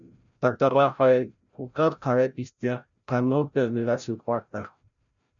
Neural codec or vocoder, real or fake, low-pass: codec, 16 kHz, 0.5 kbps, FreqCodec, larger model; fake; 7.2 kHz